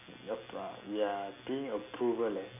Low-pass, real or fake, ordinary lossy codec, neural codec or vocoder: 3.6 kHz; real; none; none